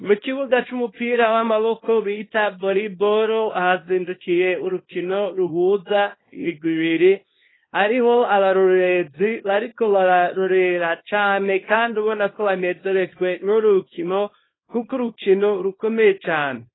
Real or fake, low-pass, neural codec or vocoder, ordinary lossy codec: fake; 7.2 kHz; codec, 24 kHz, 0.9 kbps, WavTokenizer, small release; AAC, 16 kbps